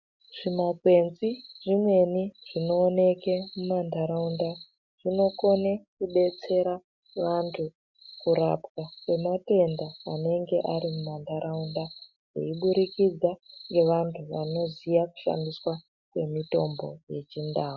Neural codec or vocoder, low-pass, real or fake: none; 7.2 kHz; real